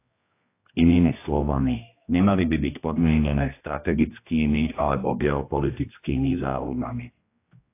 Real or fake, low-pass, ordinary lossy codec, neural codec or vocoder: fake; 3.6 kHz; AAC, 24 kbps; codec, 16 kHz, 1 kbps, X-Codec, HuBERT features, trained on general audio